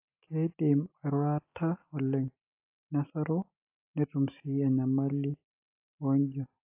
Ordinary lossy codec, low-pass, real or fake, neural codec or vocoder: AAC, 32 kbps; 3.6 kHz; real; none